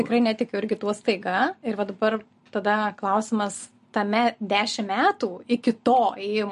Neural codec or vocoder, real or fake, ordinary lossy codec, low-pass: none; real; MP3, 48 kbps; 10.8 kHz